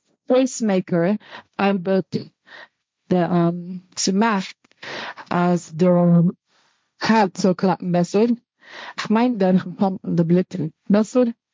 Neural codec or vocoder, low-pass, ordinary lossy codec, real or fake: codec, 16 kHz, 1.1 kbps, Voila-Tokenizer; none; none; fake